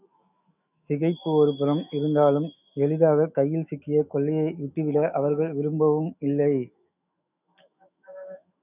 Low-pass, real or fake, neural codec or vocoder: 3.6 kHz; fake; autoencoder, 48 kHz, 128 numbers a frame, DAC-VAE, trained on Japanese speech